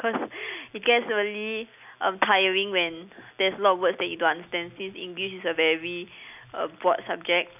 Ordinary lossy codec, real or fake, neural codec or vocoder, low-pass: none; real; none; 3.6 kHz